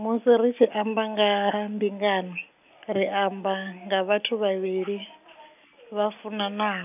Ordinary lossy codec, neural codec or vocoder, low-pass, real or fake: none; none; 3.6 kHz; real